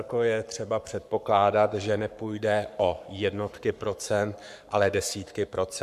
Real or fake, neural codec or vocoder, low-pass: fake; codec, 44.1 kHz, 7.8 kbps, Pupu-Codec; 14.4 kHz